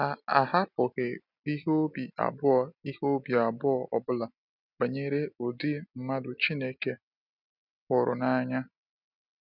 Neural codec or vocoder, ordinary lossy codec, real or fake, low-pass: none; none; real; 5.4 kHz